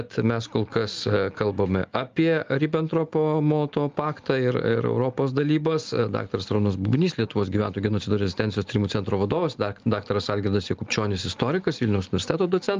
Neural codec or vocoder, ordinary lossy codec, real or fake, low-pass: none; Opus, 32 kbps; real; 7.2 kHz